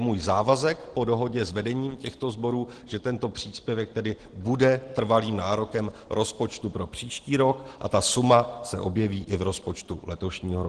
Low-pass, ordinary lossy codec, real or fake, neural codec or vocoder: 9.9 kHz; Opus, 16 kbps; real; none